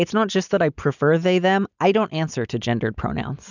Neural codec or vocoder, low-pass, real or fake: none; 7.2 kHz; real